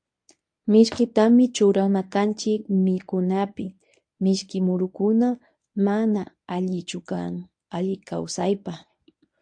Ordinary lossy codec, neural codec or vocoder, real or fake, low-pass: AAC, 64 kbps; codec, 24 kHz, 0.9 kbps, WavTokenizer, medium speech release version 1; fake; 9.9 kHz